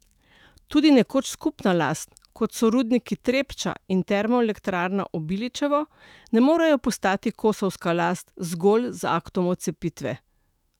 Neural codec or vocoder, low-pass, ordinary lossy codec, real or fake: autoencoder, 48 kHz, 128 numbers a frame, DAC-VAE, trained on Japanese speech; 19.8 kHz; none; fake